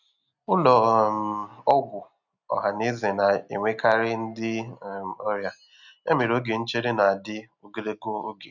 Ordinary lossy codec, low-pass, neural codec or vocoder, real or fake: none; 7.2 kHz; none; real